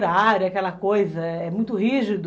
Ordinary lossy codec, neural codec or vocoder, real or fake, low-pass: none; none; real; none